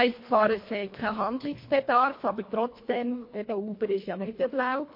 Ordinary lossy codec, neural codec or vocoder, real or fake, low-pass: MP3, 32 kbps; codec, 24 kHz, 1.5 kbps, HILCodec; fake; 5.4 kHz